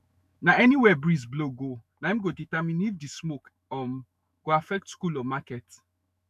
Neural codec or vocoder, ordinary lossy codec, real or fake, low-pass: autoencoder, 48 kHz, 128 numbers a frame, DAC-VAE, trained on Japanese speech; none; fake; 14.4 kHz